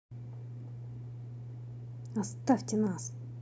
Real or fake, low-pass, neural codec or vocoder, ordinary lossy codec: real; none; none; none